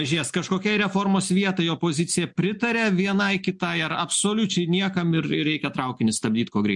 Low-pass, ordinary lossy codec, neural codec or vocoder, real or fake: 10.8 kHz; MP3, 64 kbps; none; real